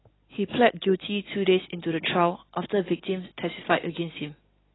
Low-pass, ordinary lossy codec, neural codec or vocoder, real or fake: 7.2 kHz; AAC, 16 kbps; none; real